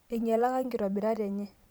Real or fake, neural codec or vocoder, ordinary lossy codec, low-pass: fake; vocoder, 44.1 kHz, 128 mel bands every 512 samples, BigVGAN v2; none; none